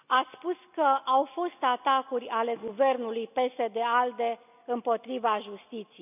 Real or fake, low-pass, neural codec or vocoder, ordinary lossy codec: real; 3.6 kHz; none; none